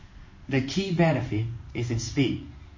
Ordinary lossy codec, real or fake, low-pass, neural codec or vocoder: MP3, 32 kbps; fake; 7.2 kHz; codec, 16 kHz in and 24 kHz out, 1 kbps, XY-Tokenizer